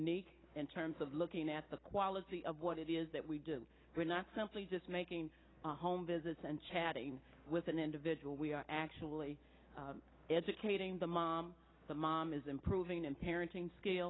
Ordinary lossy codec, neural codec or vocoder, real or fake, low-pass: AAC, 16 kbps; none; real; 7.2 kHz